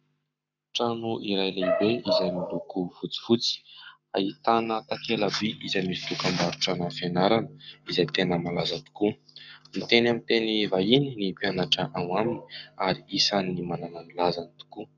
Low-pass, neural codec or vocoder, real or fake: 7.2 kHz; codec, 44.1 kHz, 7.8 kbps, Pupu-Codec; fake